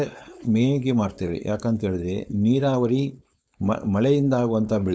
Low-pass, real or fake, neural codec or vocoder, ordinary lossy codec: none; fake; codec, 16 kHz, 4.8 kbps, FACodec; none